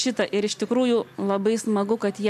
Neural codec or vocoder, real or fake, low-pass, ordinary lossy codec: none; real; 14.4 kHz; AAC, 96 kbps